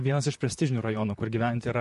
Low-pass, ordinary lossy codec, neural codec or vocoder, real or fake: 14.4 kHz; MP3, 48 kbps; vocoder, 44.1 kHz, 128 mel bands, Pupu-Vocoder; fake